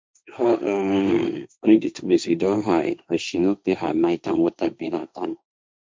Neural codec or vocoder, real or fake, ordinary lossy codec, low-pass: codec, 16 kHz, 1.1 kbps, Voila-Tokenizer; fake; none; none